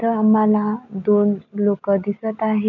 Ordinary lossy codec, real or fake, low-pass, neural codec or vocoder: none; real; 7.2 kHz; none